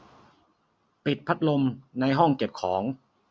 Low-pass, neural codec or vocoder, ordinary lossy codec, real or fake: none; none; none; real